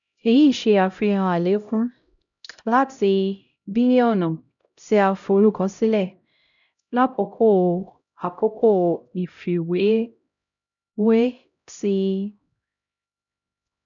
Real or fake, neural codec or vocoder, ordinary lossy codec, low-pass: fake; codec, 16 kHz, 0.5 kbps, X-Codec, HuBERT features, trained on LibriSpeech; none; 7.2 kHz